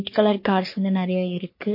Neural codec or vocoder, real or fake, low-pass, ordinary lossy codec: codec, 44.1 kHz, 3.4 kbps, Pupu-Codec; fake; 5.4 kHz; MP3, 32 kbps